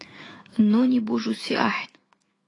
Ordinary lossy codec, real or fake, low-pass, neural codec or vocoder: AAC, 32 kbps; fake; 10.8 kHz; autoencoder, 48 kHz, 128 numbers a frame, DAC-VAE, trained on Japanese speech